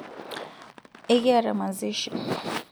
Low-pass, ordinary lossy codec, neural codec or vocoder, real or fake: none; none; none; real